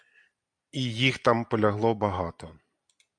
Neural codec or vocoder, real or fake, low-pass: none; real; 9.9 kHz